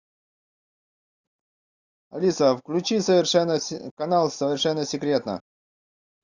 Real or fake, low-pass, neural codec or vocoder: real; 7.2 kHz; none